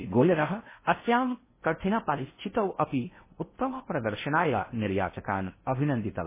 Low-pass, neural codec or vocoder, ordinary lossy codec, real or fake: 3.6 kHz; codec, 16 kHz in and 24 kHz out, 0.6 kbps, FocalCodec, streaming, 4096 codes; MP3, 16 kbps; fake